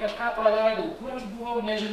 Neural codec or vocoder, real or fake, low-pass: codec, 32 kHz, 1.9 kbps, SNAC; fake; 14.4 kHz